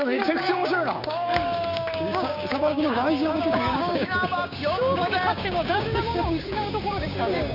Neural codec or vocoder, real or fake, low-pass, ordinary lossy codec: codec, 16 kHz, 6 kbps, DAC; fake; 5.4 kHz; none